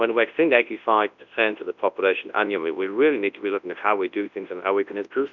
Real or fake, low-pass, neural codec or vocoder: fake; 7.2 kHz; codec, 24 kHz, 0.9 kbps, WavTokenizer, large speech release